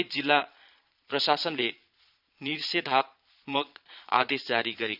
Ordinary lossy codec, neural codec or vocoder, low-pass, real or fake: none; vocoder, 22.05 kHz, 80 mel bands, Vocos; 5.4 kHz; fake